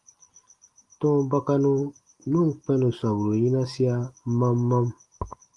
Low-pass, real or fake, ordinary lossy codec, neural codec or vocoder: 10.8 kHz; real; Opus, 24 kbps; none